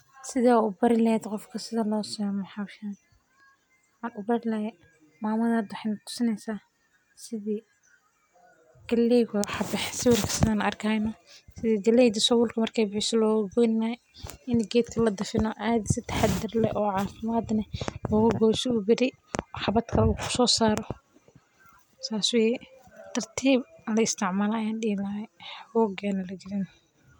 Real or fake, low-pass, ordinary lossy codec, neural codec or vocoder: real; none; none; none